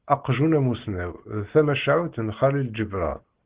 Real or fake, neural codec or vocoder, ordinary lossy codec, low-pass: real; none; Opus, 16 kbps; 3.6 kHz